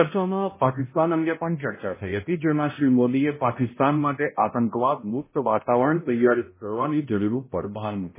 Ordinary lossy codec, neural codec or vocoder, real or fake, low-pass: MP3, 16 kbps; codec, 16 kHz, 0.5 kbps, X-Codec, HuBERT features, trained on balanced general audio; fake; 3.6 kHz